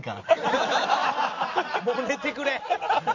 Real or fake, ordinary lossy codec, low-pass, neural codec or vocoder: real; none; 7.2 kHz; none